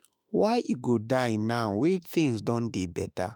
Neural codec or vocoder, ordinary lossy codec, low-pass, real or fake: autoencoder, 48 kHz, 32 numbers a frame, DAC-VAE, trained on Japanese speech; none; none; fake